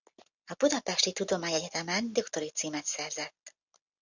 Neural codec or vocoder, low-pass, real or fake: none; 7.2 kHz; real